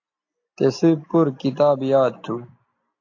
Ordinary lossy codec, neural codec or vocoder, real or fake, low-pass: AAC, 48 kbps; none; real; 7.2 kHz